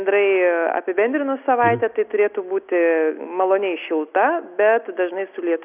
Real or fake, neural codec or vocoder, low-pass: real; none; 3.6 kHz